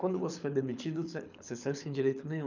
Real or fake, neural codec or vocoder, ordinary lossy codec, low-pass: fake; codec, 24 kHz, 6 kbps, HILCodec; none; 7.2 kHz